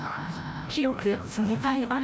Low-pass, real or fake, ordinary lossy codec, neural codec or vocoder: none; fake; none; codec, 16 kHz, 0.5 kbps, FreqCodec, larger model